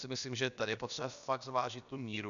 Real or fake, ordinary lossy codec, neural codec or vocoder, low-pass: fake; MP3, 96 kbps; codec, 16 kHz, about 1 kbps, DyCAST, with the encoder's durations; 7.2 kHz